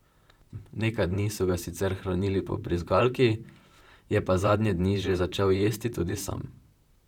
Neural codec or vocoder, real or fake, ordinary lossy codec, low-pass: vocoder, 44.1 kHz, 128 mel bands, Pupu-Vocoder; fake; none; 19.8 kHz